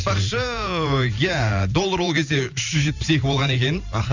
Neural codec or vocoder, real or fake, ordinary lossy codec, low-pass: vocoder, 44.1 kHz, 128 mel bands every 512 samples, BigVGAN v2; fake; none; 7.2 kHz